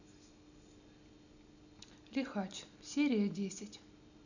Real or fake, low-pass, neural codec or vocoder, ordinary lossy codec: real; 7.2 kHz; none; none